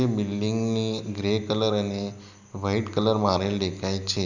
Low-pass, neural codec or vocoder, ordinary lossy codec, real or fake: 7.2 kHz; none; none; real